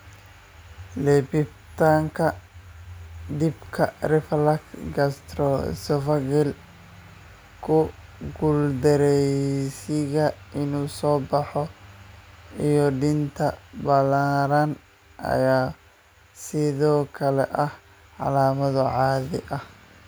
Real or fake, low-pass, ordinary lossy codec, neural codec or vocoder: real; none; none; none